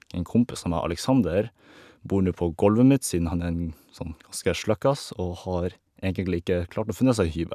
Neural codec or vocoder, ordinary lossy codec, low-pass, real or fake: none; none; 14.4 kHz; real